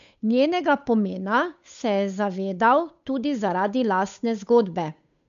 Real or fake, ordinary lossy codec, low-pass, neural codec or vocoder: fake; MP3, 64 kbps; 7.2 kHz; codec, 16 kHz, 8 kbps, FunCodec, trained on Chinese and English, 25 frames a second